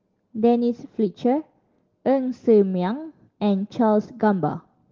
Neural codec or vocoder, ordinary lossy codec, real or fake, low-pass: none; Opus, 16 kbps; real; 7.2 kHz